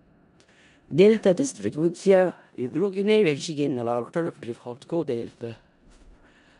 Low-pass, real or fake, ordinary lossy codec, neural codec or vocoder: 10.8 kHz; fake; none; codec, 16 kHz in and 24 kHz out, 0.4 kbps, LongCat-Audio-Codec, four codebook decoder